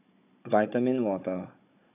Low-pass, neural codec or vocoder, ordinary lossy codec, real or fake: 3.6 kHz; codec, 16 kHz, 16 kbps, FunCodec, trained on Chinese and English, 50 frames a second; none; fake